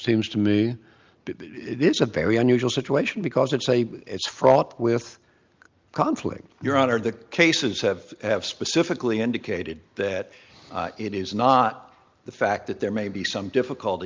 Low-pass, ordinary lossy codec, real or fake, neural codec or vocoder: 7.2 kHz; Opus, 24 kbps; real; none